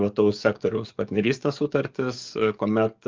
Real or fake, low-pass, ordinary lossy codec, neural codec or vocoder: fake; 7.2 kHz; Opus, 24 kbps; codec, 44.1 kHz, 7.8 kbps, DAC